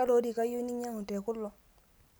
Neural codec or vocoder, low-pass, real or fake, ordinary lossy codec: none; none; real; none